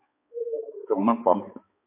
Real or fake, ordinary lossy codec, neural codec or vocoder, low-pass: fake; MP3, 24 kbps; codec, 16 kHz, 4 kbps, X-Codec, HuBERT features, trained on balanced general audio; 3.6 kHz